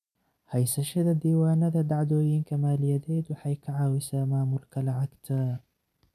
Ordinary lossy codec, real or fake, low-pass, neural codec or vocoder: none; fake; 14.4 kHz; vocoder, 44.1 kHz, 128 mel bands every 256 samples, BigVGAN v2